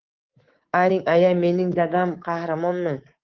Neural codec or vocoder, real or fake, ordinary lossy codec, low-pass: codec, 24 kHz, 3.1 kbps, DualCodec; fake; Opus, 16 kbps; 7.2 kHz